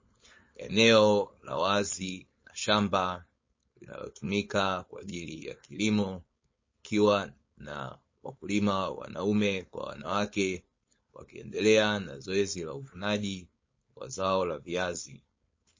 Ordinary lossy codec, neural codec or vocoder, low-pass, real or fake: MP3, 32 kbps; codec, 16 kHz, 4.8 kbps, FACodec; 7.2 kHz; fake